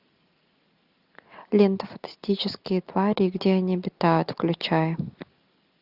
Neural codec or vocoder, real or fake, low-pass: none; real; 5.4 kHz